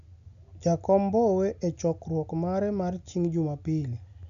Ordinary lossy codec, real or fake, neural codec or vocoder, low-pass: none; real; none; 7.2 kHz